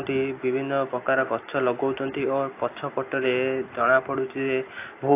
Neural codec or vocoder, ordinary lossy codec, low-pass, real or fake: none; AAC, 24 kbps; 3.6 kHz; real